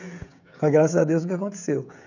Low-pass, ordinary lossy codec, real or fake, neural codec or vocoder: 7.2 kHz; none; fake; codec, 16 kHz, 16 kbps, FreqCodec, smaller model